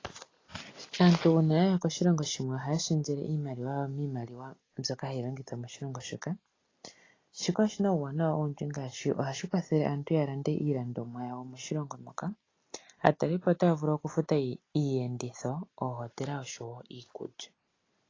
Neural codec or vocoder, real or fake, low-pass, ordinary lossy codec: none; real; 7.2 kHz; AAC, 32 kbps